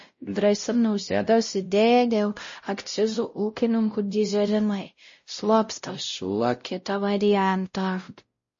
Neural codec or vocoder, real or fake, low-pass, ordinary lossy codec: codec, 16 kHz, 0.5 kbps, X-Codec, WavLM features, trained on Multilingual LibriSpeech; fake; 7.2 kHz; MP3, 32 kbps